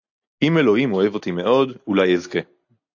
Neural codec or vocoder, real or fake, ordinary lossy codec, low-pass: none; real; AAC, 48 kbps; 7.2 kHz